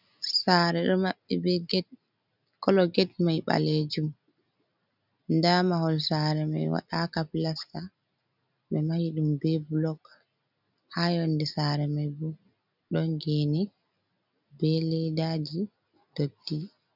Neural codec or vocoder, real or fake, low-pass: none; real; 5.4 kHz